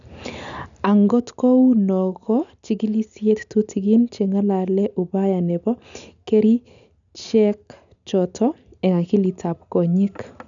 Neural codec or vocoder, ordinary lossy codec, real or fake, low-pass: none; none; real; 7.2 kHz